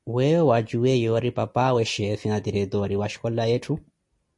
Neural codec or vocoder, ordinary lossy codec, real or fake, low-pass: none; MP3, 48 kbps; real; 10.8 kHz